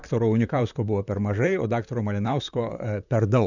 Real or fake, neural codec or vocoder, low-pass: fake; vocoder, 44.1 kHz, 128 mel bands, Pupu-Vocoder; 7.2 kHz